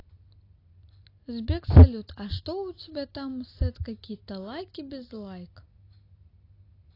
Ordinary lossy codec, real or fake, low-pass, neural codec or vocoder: AAC, 32 kbps; real; 5.4 kHz; none